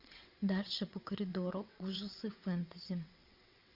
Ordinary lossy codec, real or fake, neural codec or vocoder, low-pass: Opus, 64 kbps; fake; vocoder, 22.05 kHz, 80 mel bands, WaveNeXt; 5.4 kHz